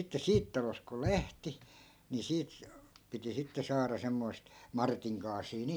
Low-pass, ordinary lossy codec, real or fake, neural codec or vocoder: none; none; real; none